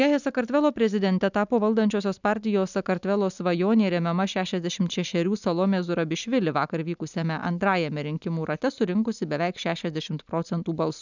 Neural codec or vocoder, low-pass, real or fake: none; 7.2 kHz; real